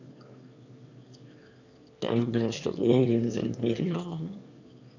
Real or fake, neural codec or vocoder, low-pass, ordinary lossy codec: fake; autoencoder, 22.05 kHz, a latent of 192 numbers a frame, VITS, trained on one speaker; 7.2 kHz; none